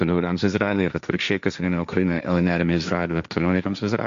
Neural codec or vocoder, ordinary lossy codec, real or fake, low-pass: codec, 16 kHz, 1.1 kbps, Voila-Tokenizer; MP3, 64 kbps; fake; 7.2 kHz